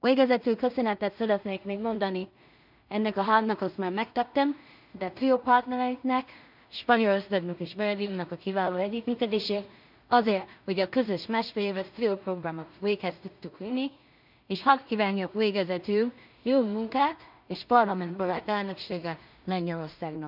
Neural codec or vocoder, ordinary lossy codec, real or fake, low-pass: codec, 16 kHz in and 24 kHz out, 0.4 kbps, LongCat-Audio-Codec, two codebook decoder; none; fake; 5.4 kHz